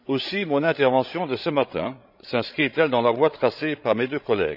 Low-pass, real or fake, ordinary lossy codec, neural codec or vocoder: 5.4 kHz; fake; none; codec, 16 kHz, 16 kbps, FreqCodec, larger model